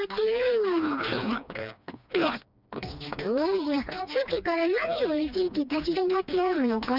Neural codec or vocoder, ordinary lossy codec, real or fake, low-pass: codec, 16 kHz, 2 kbps, FreqCodec, smaller model; none; fake; 5.4 kHz